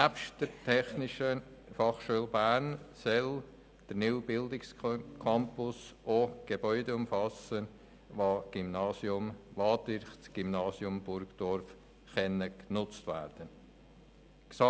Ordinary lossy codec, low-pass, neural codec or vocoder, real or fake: none; none; none; real